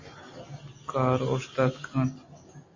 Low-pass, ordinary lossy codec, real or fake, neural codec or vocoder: 7.2 kHz; MP3, 32 kbps; real; none